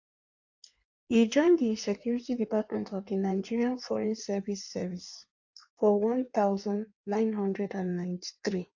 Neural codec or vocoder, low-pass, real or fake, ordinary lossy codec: codec, 16 kHz in and 24 kHz out, 1.1 kbps, FireRedTTS-2 codec; 7.2 kHz; fake; AAC, 48 kbps